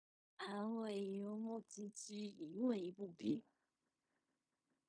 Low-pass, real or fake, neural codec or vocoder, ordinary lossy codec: 9.9 kHz; fake; codec, 16 kHz in and 24 kHz out, 0.4 kbps, LongCat-Audio-Codec, fine tuned four codebook decoder; MP3, 64 kbps